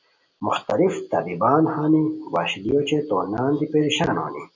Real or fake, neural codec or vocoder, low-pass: real; none; 7.2 kHz